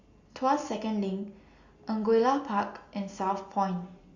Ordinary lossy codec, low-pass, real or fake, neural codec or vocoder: Opus, 64 kbps; 7.2 kHz; real; none